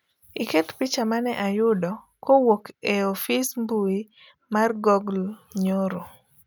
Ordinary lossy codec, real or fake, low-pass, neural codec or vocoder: none; real; none; none